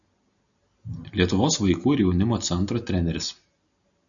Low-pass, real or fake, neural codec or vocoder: 7.2 kHz; real; none